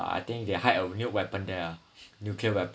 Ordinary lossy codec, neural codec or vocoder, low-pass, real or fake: none; none; none; real